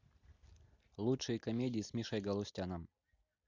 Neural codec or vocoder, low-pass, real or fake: none; 7.2 kHz; real